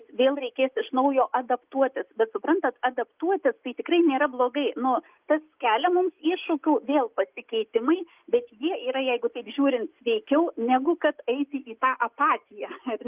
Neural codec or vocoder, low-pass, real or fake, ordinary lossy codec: none; 3.6 kHz; real; Opus, 32 kbps